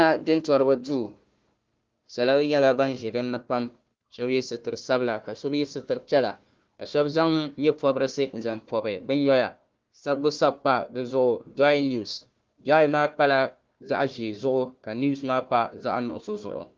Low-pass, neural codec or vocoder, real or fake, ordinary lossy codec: 7.2 kHz; codec, 16 kHz, 1 kbps, FunCodec, trained on Chinese and English, 50 frames a second; fake; Opus, 24 kbps